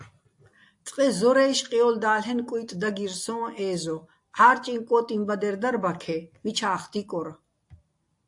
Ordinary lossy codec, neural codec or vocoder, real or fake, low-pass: AAC, 64 kbps; none; real; 10.8 kHz